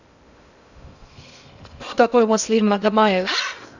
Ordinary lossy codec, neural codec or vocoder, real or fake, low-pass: none; codec, 16 kHz in and 24 kHz out, 0.6 kbps, FocalCodec, streaming, 2048 codes; fake; 7.2 kHz